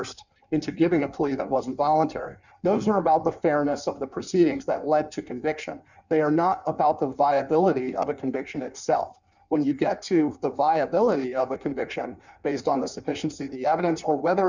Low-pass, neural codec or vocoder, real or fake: 7.2 kHz; codec, 16 kHz in and 24 kHz out, 1.1 kbps, FireRedTTS-2 codec; fake